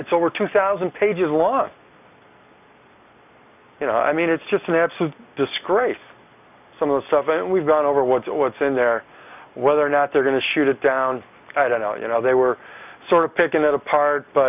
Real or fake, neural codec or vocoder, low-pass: real; none; 3.6 kHz